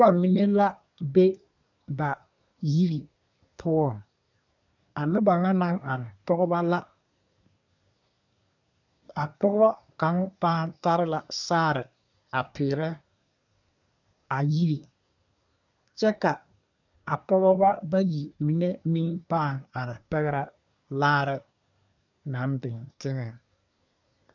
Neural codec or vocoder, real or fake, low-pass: codec, 24 kHz, 1 kbps, SNAC; fake; 7.2 kHz